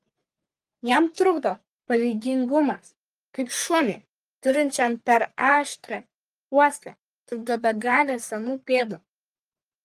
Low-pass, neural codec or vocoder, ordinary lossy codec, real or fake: 14.4 kHz; codec, 44.1 kHz, 3.4 kbps, Pupu-Codec; Opus, 32 kbps; fake